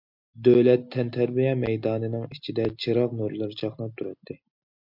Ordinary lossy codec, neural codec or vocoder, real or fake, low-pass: MP3, 48 kbps; none; real; 5.4 kHz